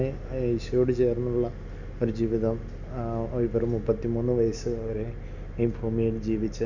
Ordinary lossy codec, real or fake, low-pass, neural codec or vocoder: none; real; 7.2 kHz; none